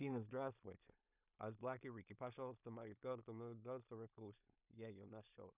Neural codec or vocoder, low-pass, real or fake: codec, 16 kHz in and 24 kHz out, 0.4 kbps, LongCat-Audio-Codec, two codebook decoder; 3.6 kHz; fake